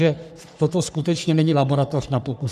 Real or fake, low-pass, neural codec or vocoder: fake; 14.4 kHz; codec, 44.1 kHz, 3.4 kbps, Pupu-Codec